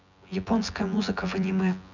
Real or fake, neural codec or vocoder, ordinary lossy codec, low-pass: fake; vocoder, 24 kHz, 100 mel bands, Vocos; none; 7.2 kHz